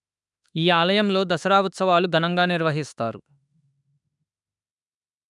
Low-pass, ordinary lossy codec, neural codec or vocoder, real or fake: 10.8 kHz; none; autoencoder, 48 kHz, 32 numbers a frame, DAC-VAE, trained on Japanese speech; fake